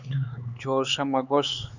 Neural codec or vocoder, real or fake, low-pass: codec, 16 kHz, 4 kbps, X-Codec, HuBERT features, trained on LibriSpeech; fake; 7.2 kHz